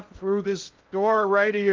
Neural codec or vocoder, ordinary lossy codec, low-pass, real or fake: codec, 16 kHz in and 24 kHz out, 0.6 kbps, FocalCodec, streaming, 2048 codes; Opus, 32 kbps; 7.2 kHz; fake